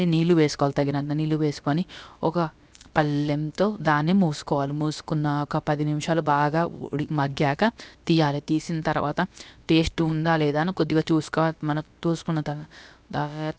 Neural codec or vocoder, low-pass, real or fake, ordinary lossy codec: codec, 16 kHz, about 1 kbps, DyCAST, with the encoder's durations; none; fake; none